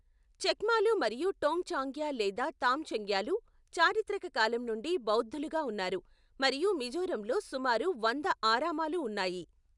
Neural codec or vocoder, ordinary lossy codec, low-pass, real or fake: none; none; 10.8 kHz; real